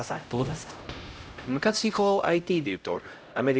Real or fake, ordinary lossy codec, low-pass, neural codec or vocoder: fake; none; none; codec, 16 kHz, 0.5 kbps, X-Codec, HuBERT features, trained on LibriSpeech